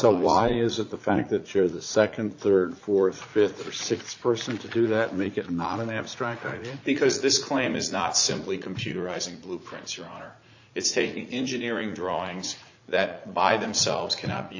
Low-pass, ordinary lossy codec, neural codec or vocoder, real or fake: 7.2 kHz; AAC, 48 kbps; vocoder, 44.1 kHz, 80 mel bands, Vocos; fake